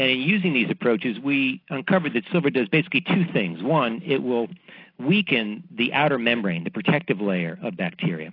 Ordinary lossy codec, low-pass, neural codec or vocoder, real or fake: AAC, 32 kbps; 5.4 kHz; none; real